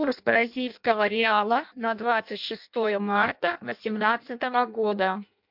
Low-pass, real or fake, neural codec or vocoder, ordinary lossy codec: 5.4 kHz; fake; codec, 16 kHz in and 24 kHz out, 0.6 kbps, FireRedTTS-2 codec; MP3, 48 kbps